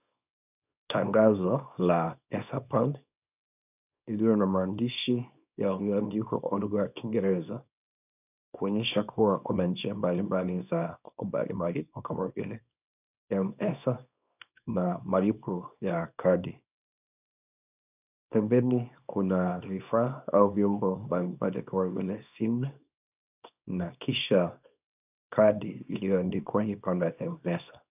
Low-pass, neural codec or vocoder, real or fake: 3.6 kHz; codec, 24 kHz, 0.9 kbps, WavTokenizer, small release; fake